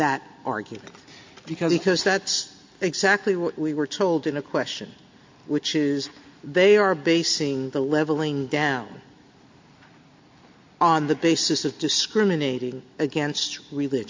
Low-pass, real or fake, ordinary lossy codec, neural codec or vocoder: 7.2 kHz; real; MP3, 48 kbps; none